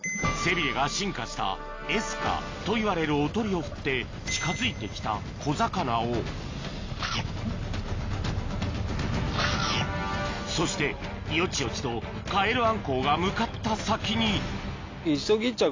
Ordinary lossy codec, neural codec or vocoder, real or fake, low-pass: AAC, 32 kbps; none; real; 7.2 kHz